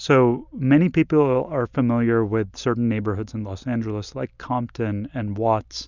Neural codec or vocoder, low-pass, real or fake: none; 7.2 kHz; real